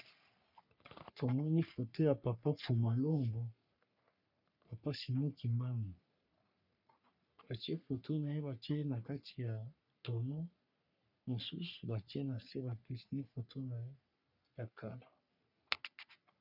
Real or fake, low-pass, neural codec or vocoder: fake; 5.4 kHz; codec, 44.1 kHz, 3.4 kbps, Pupu-Codec